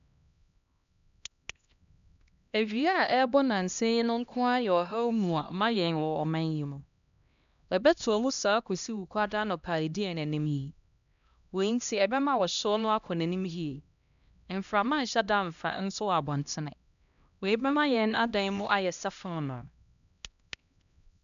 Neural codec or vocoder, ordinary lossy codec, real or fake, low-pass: codec, 16 kHz, 1 kbps, X-Codec, HuBERT features, trained on LibriSpeech; none; fake; 7.2 kHz